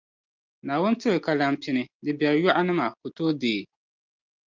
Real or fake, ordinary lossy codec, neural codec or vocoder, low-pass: real; Opus, 32 kbps; none; 7.2 kHz